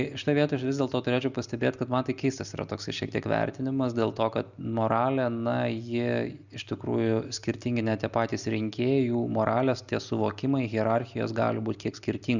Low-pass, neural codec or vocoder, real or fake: 7.2 kHz; none; real